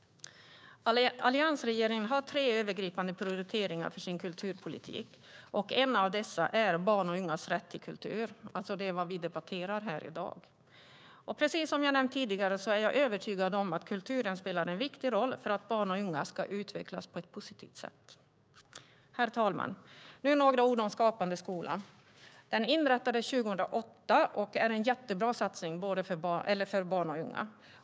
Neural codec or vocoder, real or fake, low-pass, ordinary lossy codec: codec, 16 kHz, 6 kbps, DAC; fake; none; none